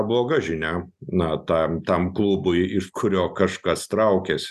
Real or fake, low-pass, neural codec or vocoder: real; 14.4 kHz; none